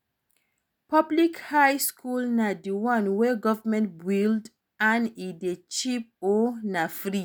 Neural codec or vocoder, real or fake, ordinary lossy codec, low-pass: none; real; none; none